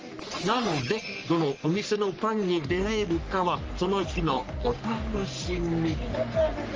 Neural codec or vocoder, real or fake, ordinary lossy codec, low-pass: codec, 44.1 kHz, 3.4 kbps, Pupu-Codec; fake; Opus, 24 kbps; 7.2 kHz